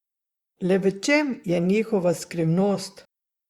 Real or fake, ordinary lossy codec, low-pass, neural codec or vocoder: fake; Opus, 64 kbps; 19.8 kHz; vocoder, 44.1 kHz, 128 mel bands, Pupu-Vocoder